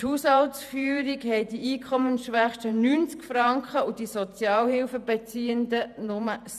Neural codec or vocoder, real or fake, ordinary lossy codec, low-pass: vocoder, 48 kHz, 128 mel bands, Vocos; fake; none; 14.4 kHz